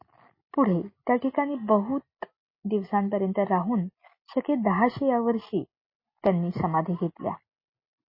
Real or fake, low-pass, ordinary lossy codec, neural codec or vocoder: real; 5.4 kHz; MP3, 24 kbps; none